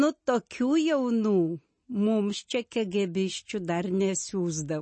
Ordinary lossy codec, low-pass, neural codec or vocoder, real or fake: MP3, 32 kbps; 9.9 kHz; none; real